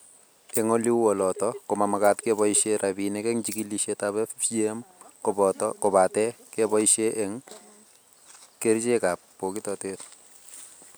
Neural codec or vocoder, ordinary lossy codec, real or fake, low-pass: none; none; real; none